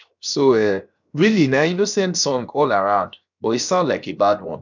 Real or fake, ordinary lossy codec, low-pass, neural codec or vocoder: fake; none; 7.2 kHz; codec, 16 kHz, 0.7 kbps, FocalCodec